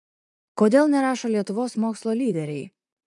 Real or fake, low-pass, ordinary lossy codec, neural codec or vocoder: fake; 10.8 kHz; MP3, 96 kbps; vocoder, 44.1 kHz, 128 mel bands, Pupu-Vocoder